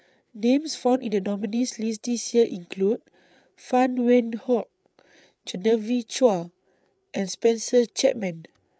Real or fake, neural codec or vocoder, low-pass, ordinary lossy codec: fake; codec, 16 kHz, 8 kbps, FreqCodec, larger model; none; none